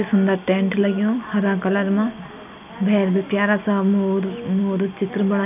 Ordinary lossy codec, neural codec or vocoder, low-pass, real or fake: none; none; 3.6 kHz; real